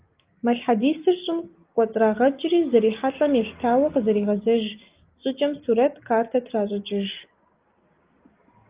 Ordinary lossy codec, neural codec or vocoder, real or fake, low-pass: Opus, 32 kbps; none; real; 3.6 kHz